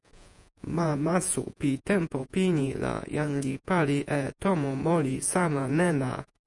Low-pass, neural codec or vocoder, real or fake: 10.8 kHz; vocoder, 48 kHz, 128 mel bands, Vocos; fake